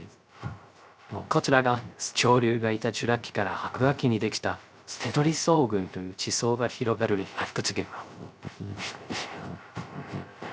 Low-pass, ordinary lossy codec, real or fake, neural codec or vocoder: none; none; fake; codec, 16 kHz, 0.3 kbps, FocalCodec